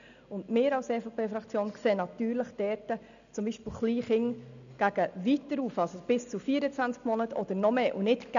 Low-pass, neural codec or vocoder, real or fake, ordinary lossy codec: 7.2 kHz; none; real; none